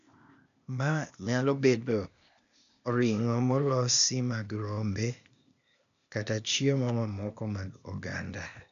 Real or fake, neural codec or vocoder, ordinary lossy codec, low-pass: fake; codec, 16 kHz, 0.8 kbps, ZipCodec; none; 7.2 kHz